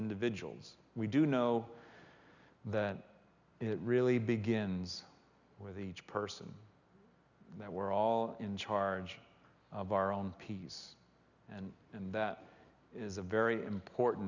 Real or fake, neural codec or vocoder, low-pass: real; none; 7.2 kHz